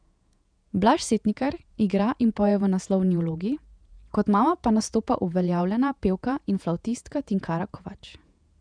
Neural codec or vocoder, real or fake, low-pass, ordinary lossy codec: vocoder, 48 kHz, 128 mel bands, Vocos; fake; 9.9 kHz; none